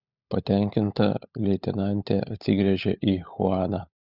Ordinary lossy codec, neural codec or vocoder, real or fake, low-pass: Opus, 64 kbps; codec, 16 kHz, 16 kbps, FunCodec, trained on LibriTTS, 50 frames a second; fake; 5.4 kHz